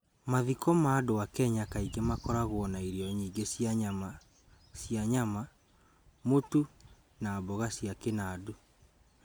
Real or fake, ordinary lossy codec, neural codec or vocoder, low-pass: real; none; none; none